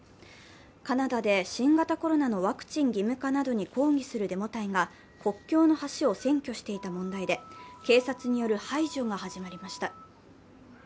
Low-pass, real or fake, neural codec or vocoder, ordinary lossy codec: none; real; none; none